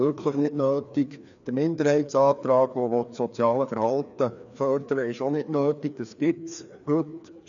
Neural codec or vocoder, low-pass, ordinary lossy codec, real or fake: codec, 16 kHz, 2 kbps, FreqCodec, larger model; 7.2 kHz; none; fake